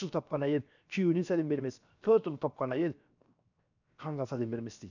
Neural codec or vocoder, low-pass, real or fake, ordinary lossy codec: codec, 16 kHz, 0.7 kbps, FocalCodec; 7.2 kHz; fake; none